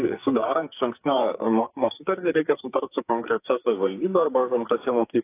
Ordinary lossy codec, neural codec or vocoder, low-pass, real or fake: AAC, 24 kbps; codec, 44.1 kHz, 2.6 kbps, SNAC; 3.6 kHz; fake